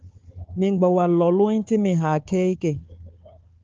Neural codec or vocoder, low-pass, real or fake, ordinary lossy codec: codec, 16 kHz, 4 kbps, FunCodec, trained on Chinese and English, 50 frames a second; 7.2 kHz; fake; Opus, 24 kbps